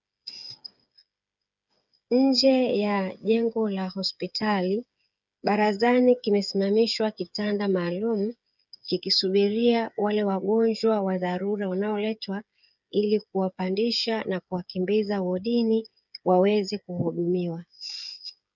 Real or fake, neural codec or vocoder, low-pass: fake; codec, 16 kHz, 8 kbps, FreqCodec, smaller model; 7.2 kHz